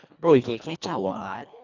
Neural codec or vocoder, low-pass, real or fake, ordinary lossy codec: codec, 24 kHz, 1.5 kbps, HILCodec; 7.2 kHz; fake; none